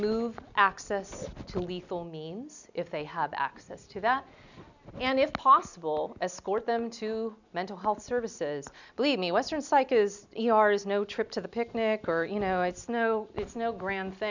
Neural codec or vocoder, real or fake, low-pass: none; real; 7.2 kHz